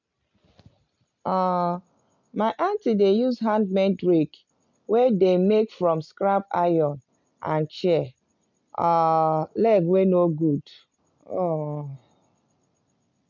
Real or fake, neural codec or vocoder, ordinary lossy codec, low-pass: real; none; MP3, 64 kbps; 7.2 kHz